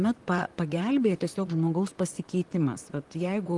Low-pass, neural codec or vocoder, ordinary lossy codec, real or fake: 10.8 kHz; vocoder, 44.1 kHz, 128 mel bands, Pupu-Vocoder; Opus, 24 kbps; fake